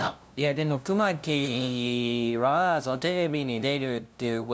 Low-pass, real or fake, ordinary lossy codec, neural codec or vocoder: none; fake; none; codec, 16 kHz, 0.5 kbps, FunCodec, trained on LibriTTS, 25 frames a second